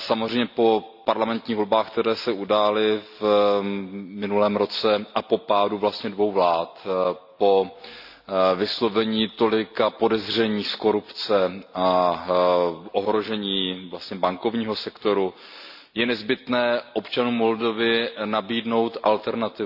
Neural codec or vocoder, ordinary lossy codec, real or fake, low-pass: none; AAC, 48 kbps; real; 5.4 kHz